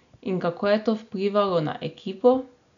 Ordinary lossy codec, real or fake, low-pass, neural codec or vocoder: MP3, 96 kbps; real; 7.2 kHz; none